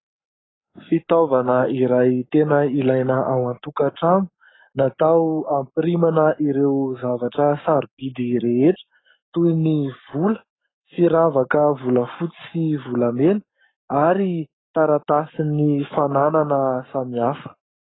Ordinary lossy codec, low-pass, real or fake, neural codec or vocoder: AAC, 16 kbps; 7.2 kHz; fake; codec, 44.1 kHz, 7.8 kbps, Pupu-Codec